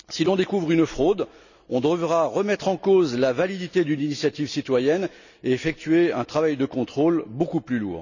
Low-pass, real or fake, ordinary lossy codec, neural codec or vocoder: 7.2 kHz; real; none; none